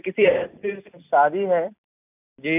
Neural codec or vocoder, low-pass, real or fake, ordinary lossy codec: none; 3.6 kHz; real; none